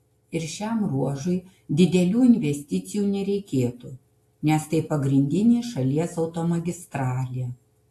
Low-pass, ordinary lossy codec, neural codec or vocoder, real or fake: 14.4 kHz; AAC, 64 kbps; none; real